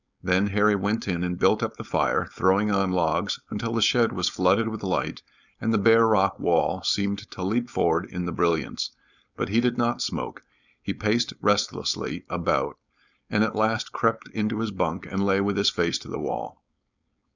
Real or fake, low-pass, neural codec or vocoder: fake; 7.2 kHz; codec, 16 kHz, 4.8 kbps, FACodec